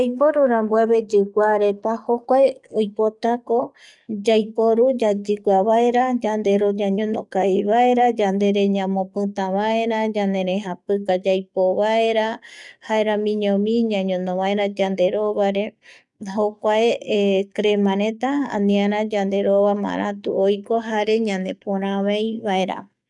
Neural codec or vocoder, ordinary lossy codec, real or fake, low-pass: codec, 44.1 kHz, 7.8 kbps, DAC; none; fake; 10.8 kHz